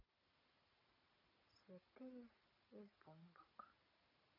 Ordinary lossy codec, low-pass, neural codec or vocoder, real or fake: MP3, 48 kbps; 5.4 kHz; none; real